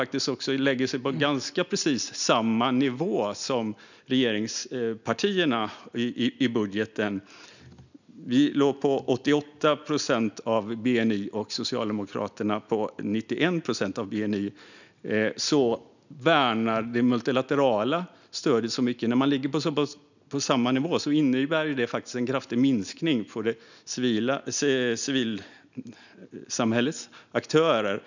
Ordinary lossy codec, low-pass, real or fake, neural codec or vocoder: none; 7.2 kHz; real; none